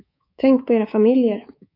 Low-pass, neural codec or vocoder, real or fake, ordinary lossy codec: 5.4 kHz; codec, 24 kHz, 3.1 kbps, DualCodec; fake; AAC, 32 kbps